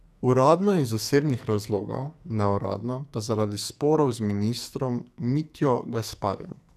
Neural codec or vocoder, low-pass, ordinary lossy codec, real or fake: codec, 44.1 kHz, 2.6 kbps, SNAC; 14.4 kHz; none; fake